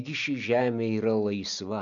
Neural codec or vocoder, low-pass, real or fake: none; 7.2 kHz; real